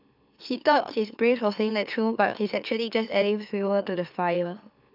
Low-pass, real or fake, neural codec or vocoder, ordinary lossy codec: 5.4 kHz; fake; autoencoder, 44.1 kHz, a latent of 192 numbers a frame, MeloTTS; none